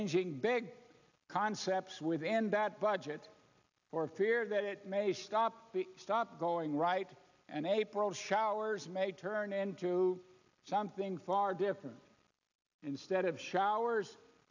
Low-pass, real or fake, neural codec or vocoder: 7.2 kHz; real; none